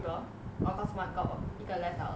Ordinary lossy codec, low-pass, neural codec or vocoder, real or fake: none; none; none; real